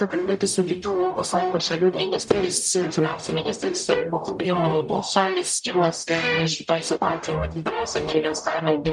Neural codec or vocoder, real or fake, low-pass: codec, 44.1 kHz, 0.9 kbps, DAC; fake; 10.8 kHz